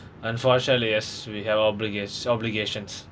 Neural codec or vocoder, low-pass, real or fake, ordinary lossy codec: none; none; real; none